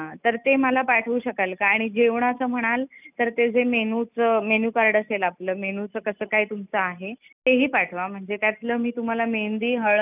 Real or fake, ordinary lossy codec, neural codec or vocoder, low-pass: real; none; none; 3.6 kHz